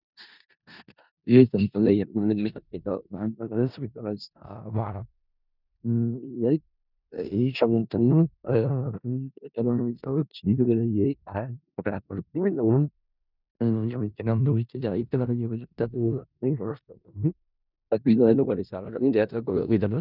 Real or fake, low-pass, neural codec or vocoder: fake; 5.4 kHz; codec, 16 kHz in and 24 kHz out, 0.4 kbps, LongCat-Audio-Codec, four codebook decoder